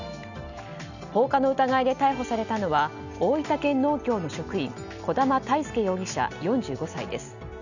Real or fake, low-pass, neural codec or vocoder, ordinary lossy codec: real; 7.2 kHz; none; none